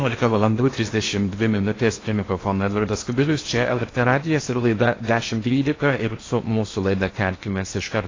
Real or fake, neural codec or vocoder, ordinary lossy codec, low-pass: fake; codec, 16 kHz in and 24 kHz out, 0.8 kbps, FocalCodec, streaming, 65536 codes; AAC, 32 kbps; 7.2 kHz